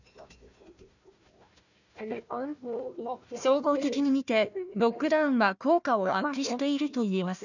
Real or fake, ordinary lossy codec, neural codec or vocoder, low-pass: fake; none; codec, 16 kHz, 1 kbps, FunCodec, trained on Chinese and English, 50 frames a second; 7.2 kHz